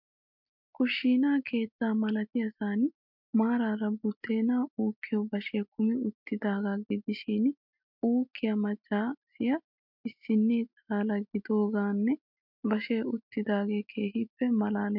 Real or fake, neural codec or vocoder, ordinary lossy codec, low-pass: real; none; MP3, 48 kbps; 5.4 kHz